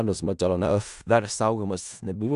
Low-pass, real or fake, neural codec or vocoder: 10.8 kHz; fake; codec, 16 kHz in and 24 kHz out, 0.4 kbps, LongCat-Audio-Codec, four codebook decoder